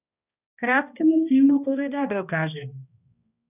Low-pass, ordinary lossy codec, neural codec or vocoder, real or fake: 3.6 kHz; none; codec, 16 kHz, 1 kbps, X-Codec, HuBERT features, trained on balanced general audio; fake